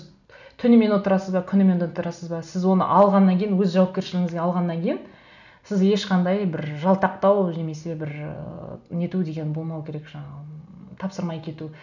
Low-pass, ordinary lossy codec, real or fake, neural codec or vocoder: 7.2 kHz; none; real; none